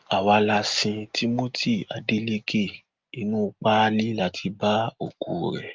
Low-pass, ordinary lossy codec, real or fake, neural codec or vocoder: 7.2 kHz; Opus, 32 kbps; real; none